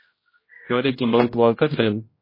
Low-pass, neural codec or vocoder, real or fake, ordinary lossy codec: 5.4 kHz; codec, 16 kHz, 0.5 kbps, X-Codec, HuBERT features, trained on general audio; fake; MP3, 24 kbps